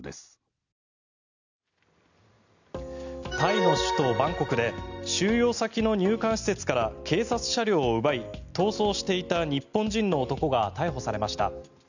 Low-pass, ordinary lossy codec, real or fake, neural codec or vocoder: 7.2 kHz; none; real; none